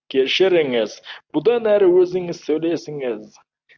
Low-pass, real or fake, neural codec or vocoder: 7.2 kHz; real; none